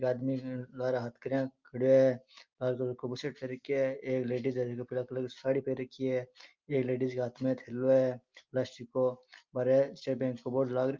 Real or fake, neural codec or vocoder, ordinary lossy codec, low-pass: real; none; Opus, 24 kbps; 7.2 kHz